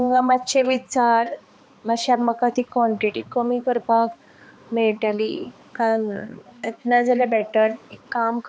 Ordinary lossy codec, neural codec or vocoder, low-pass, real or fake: none; codec, 16 kHz, 2 kbps, X-Codec, HuBERT features, trained on balanced general audio; none; fake